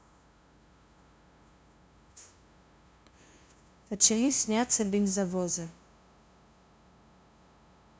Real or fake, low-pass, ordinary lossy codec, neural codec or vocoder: fake; none; none; codec, 16 kHz, 0.5 kbps, FunCodec, trained on LibriTTS, 25 frames a second